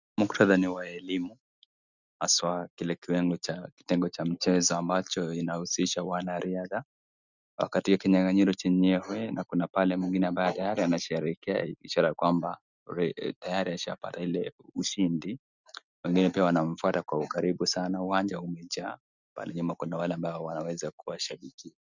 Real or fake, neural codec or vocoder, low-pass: real; none; 7.2 kHz